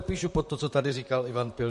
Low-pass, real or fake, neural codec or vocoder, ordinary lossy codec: 14.4 kHz; fake; vocoder, 44.1 kHz, 128 mel bands, Pupu-Vocoder; MP3, 48 kbps